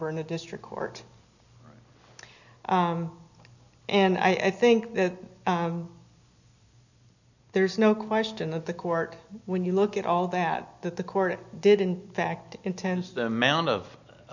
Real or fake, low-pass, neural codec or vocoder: real; 7.2 kHz; none